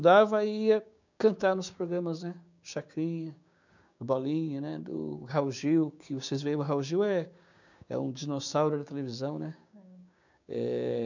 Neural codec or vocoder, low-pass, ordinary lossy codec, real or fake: codec, 16 kHz, 6 kbps, DAC; 7.2 kHz; none; fake